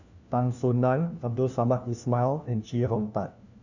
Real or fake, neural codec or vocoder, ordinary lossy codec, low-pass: fake; codec, 16 kHz, 1 kbps, FunCodec, trained on LibriTTS, 50 frames a second; none; 7.2 kHz